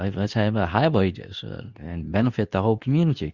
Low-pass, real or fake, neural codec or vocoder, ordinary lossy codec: 7.2 kHz; fake; codec, 24 kHz, 0.9 kbps, WavTokenizer, medium speech release version 2; Opus, 64 kbps